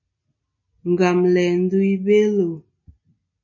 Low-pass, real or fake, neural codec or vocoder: 7.2 kHz; real; none